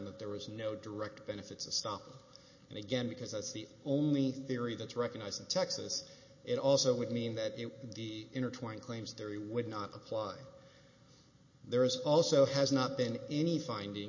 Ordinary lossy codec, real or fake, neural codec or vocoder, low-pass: MP3, 32 kbps; real; none; 7.2 kHz